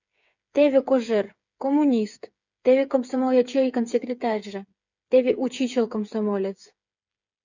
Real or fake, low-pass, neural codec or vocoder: fake; 7.2 kHz; codec, 16 kHz, 8 kbps, FreqCodec, smaller model